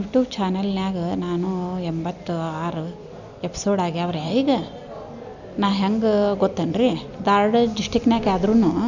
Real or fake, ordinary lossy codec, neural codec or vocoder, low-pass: real; none; none; 7.2 kHz